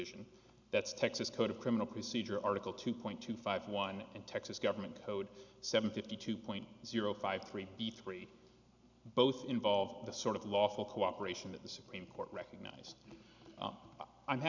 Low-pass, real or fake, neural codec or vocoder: 7.2 kHz; real; none